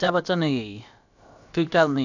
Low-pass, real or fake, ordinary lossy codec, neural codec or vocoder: 7.2 kHz; fake; none; codec, 16 kHz, about 1 kbps, DyCAST, with the encoder's durations